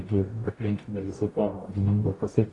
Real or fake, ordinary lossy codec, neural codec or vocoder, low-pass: fake; AAC, 32 kbps; codec, 44.1 kHz, 0.9 kbps, DAC; 10.8 kHz